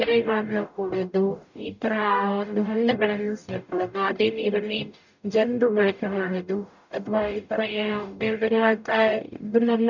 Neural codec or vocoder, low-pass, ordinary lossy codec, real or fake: codec, 44.1 kHz, 0.9 kbps, DAC; 7.2 kHz; none; fake